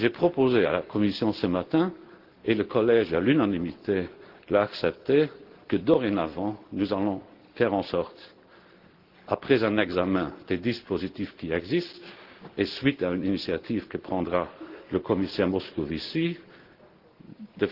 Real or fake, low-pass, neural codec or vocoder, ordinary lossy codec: real; 5.4 kHz; none; Opus, 16 kbps